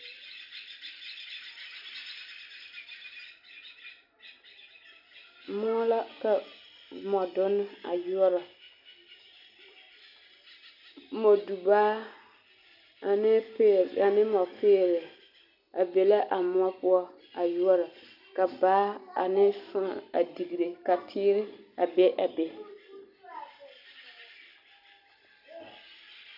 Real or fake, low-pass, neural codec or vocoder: real; 5.4 kHz; none